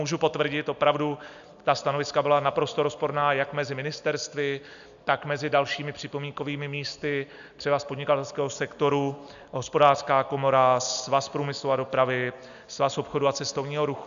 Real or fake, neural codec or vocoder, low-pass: real; none; 7.2 kHz